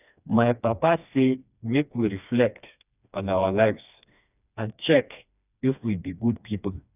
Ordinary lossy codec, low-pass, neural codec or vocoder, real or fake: none; 3.6 kHz; codec, 16 kHz, 2 kbps, FreqCodec, smaller model; fake